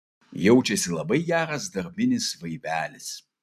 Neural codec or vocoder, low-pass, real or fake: none; 14.4 kHz; real